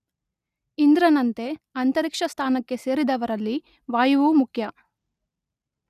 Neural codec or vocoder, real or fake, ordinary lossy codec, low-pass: none; real; none; 14.4 kHz